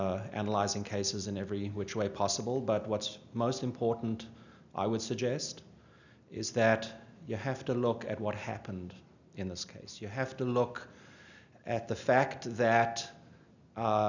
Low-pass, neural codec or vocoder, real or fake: 7.2 kHz; none; real